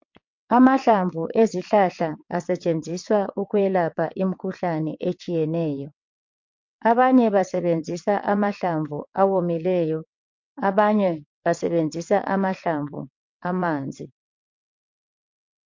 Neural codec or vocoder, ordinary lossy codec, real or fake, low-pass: vocoder, 22.05 kHz, 80 mel bands, WaveNeXt; MP3, 48 kbps; fake; 7.2 kHz